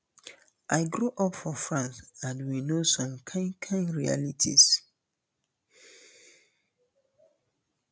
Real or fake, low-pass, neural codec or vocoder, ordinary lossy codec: real; none; none; none